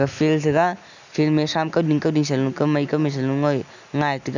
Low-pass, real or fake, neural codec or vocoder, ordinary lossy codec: 7.2 kHz; real; none; none